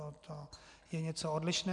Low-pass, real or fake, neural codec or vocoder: 10.8 kHz; real; none